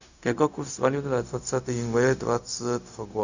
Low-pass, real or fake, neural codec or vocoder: 7.2 kHz; fake; codec, 16 kHz, 0.4 kbps, LongCat-Audio-Codec